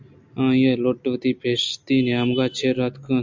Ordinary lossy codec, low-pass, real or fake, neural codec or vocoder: MP3, 64 kbps; 7.2 kHz; real; none